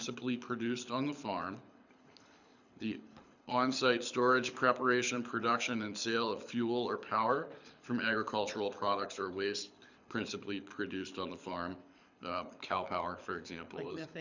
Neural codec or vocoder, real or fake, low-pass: codec, 24 kHz, 6 kbps, HILCodec; fake; 7.2 kHz